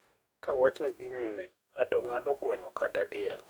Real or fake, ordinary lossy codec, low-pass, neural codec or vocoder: fake; none; none; codec, 44.1 kHz, 2.6 kbps, DAC